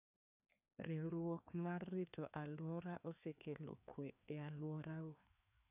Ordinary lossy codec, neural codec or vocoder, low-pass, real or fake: none; codec, 16 kHz, 2 kbps, FreqCodec, larger model; 3.6 kHz; fake